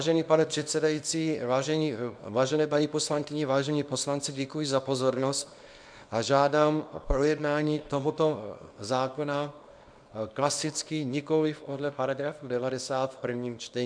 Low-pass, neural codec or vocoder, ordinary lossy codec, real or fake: 9.9 kHz; codec, 24 kHz, 0.9 kbps, WavTokenizer, small release; MP3, 96 kbps; fake